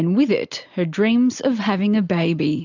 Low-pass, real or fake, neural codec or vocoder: 7.2 kHz; real; none